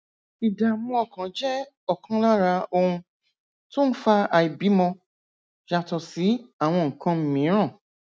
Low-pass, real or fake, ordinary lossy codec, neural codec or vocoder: none; real; none; none